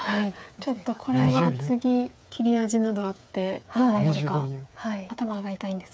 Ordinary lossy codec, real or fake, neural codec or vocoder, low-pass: none; fake; codec, 16 kHz, 8 kbps, FreqCodec, smaller model; none